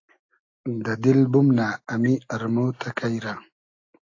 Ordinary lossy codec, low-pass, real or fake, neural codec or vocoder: AAC, 48 kbps; 7.2 kHz; real; none